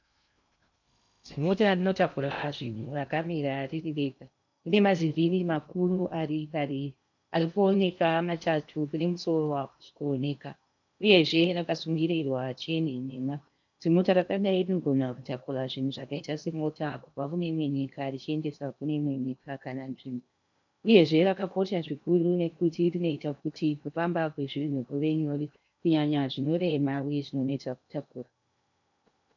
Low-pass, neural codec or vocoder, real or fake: 7.2 kHz; codec, 16 kHz in and 24 kHz out, 0.8 kbps, FocalCodec, streaming, 65536 codes; fake